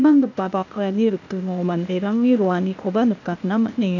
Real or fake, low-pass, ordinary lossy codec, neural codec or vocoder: fake; 7.2 kHz; none; codec, 16 kHz, 0.8 kbps, ZipCodec